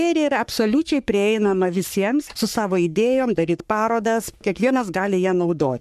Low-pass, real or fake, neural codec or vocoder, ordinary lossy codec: 14.4 kHz; fake; codec, 44.1 kHz, 3.4 kbps, Pupu-Codec; MP3, 96 kbps